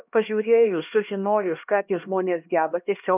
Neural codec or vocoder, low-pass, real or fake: codec, 16 kHz, 1 kbps, X-Codec, HuBERT features, trained on LibriSpeech; 3.6 kHz; fake